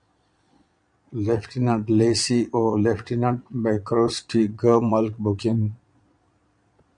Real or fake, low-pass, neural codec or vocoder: fake; 9.9 kHz; vocoder, 22.05 kHz, 80 mel bands, Vocos